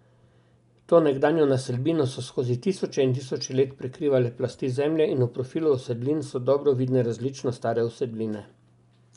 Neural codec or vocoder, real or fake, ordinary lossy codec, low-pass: none; real; none; 10.8 kHz